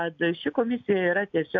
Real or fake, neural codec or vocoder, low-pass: real; none; 7.2 kHz